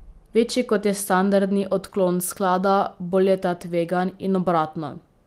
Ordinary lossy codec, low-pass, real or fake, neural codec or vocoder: Opus, 24 kbps; 14.4 kHz; real; none